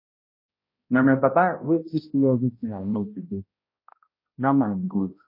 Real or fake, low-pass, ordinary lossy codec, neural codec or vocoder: fake; 5.4 kHz; MP3, 24 kbps; codec, 16 kHz, 0.5 kbps, X-Codec, HuBERT features, trained on balanced general audio